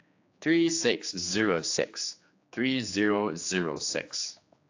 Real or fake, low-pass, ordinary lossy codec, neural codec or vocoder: fake; 7.2 kHz; AAC, 48 kbps; codec, 16 kHz, 2 kbps, X-Codec, HuBERT features, trained on general audio